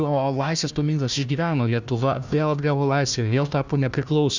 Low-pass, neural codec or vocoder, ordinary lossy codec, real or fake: 7.2 kHz; codec, 16 kHz, 1 kbps, FunCodec, trained on Chinese and English, 50 frames a second; Opus, 64 kbps; fake